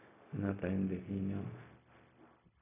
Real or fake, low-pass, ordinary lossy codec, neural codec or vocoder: fake; 3.6 kHz; none; codec, 16 kHz, 0.4 kbps, LongCat-Audio-Codec